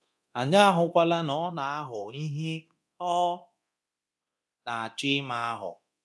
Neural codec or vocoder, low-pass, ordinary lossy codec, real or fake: codec, 24 kHz, 0.9 kbps, DualCodec; none; none; fake